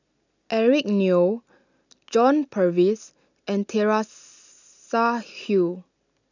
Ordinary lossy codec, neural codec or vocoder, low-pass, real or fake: none; none; 7.2 kHz; real